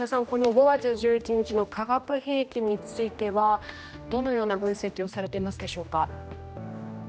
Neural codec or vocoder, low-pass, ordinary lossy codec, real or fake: codec, 16 kHz, 1 kbps, X-Codec, HuBERT features, trained on general audio; none; none; fake